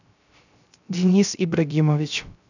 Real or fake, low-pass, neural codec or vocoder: fake; 7.2 kHz; codec, 16 kHz, 0.3 kbps, FocalCodec